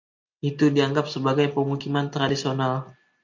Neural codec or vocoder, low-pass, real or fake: none; 7.2 kHz; real